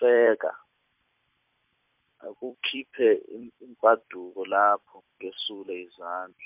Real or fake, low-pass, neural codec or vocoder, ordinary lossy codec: fake; 3.6 kHz; vocoder, 44.1 kHz, 128 mel bands every 256 samples, BigVGAN v2; MP3, 32 kbps